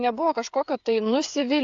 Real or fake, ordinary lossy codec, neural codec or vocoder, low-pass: fake; AAC, 64 kbps; codec, 16 kHz, 8 kbps, FreqCodec, smaller model; 7.2 kHz